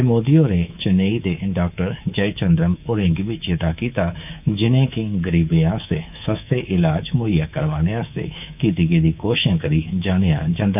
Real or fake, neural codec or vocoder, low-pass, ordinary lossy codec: fake; codec, 24 kHz, 3.1 kbps, DualCodec; 3.6 kHz; none